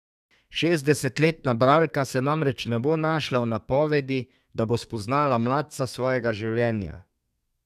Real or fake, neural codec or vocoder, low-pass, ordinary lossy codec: fake; codec, 32 kHz, 1.9 kbps, SNAC; 14.4 kHz; none